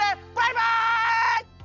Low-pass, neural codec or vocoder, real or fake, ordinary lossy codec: 7.2 kHz; none; real; none